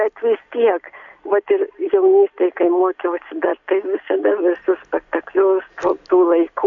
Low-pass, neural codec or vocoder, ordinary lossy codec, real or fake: 7.2 kHz; none; AAC, 64 kbps; real